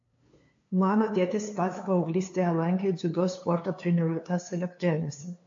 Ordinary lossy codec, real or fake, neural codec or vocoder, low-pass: AAC, 48 kbps; fake; codec, 16 kHz, 2 kbps, FunCodec, trained on LibriTTS, 25 frames a second; 7.2 kHz